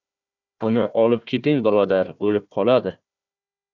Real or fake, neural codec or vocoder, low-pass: fake; codec, 16 kHz, 1 kbps, FunCodec, trained on Chinese and English, 50 frames a second; 7.2 kHz